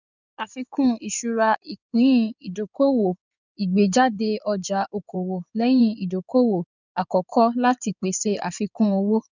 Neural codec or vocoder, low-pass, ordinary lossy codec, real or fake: codec, 16 kHz in and 24 kHz out, 2.2 kbps, FireRedTTS-2 codec; 7.2 kHz; none; fake